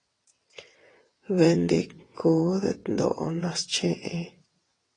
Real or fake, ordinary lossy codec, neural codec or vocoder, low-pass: fake; AAC, 32 kbps; vocoder, 22.05 kHz, 80 mel bands, WaveNeXt; 9.9 kHz